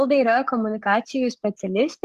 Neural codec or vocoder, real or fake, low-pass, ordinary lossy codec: codec, 44.1 kHz, 7.8 kbps, DAC; fake; 14.4 kHz; Opus, 64 kbps